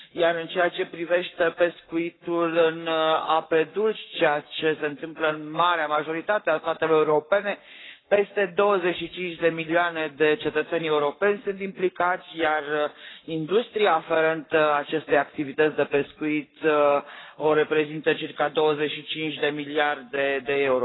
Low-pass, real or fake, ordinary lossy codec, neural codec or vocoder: 7.2 kHz; fake; AAC, 16 kbps; codec, 44.1 kHz, 7.8 kbps, Pupu-Codec